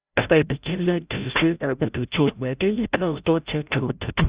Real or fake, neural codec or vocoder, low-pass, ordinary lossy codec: fake; codec, 16 kHz, 0.5 kbps, FreqCodec, larger model; 3.6 kHz; Opus, 64 kbps